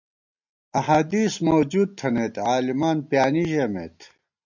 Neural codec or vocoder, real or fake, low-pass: none; real; 7.2 kHz